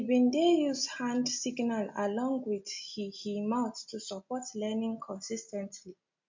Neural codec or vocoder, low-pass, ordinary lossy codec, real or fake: none; 7.2 kHz; MP3, 48 kbps; real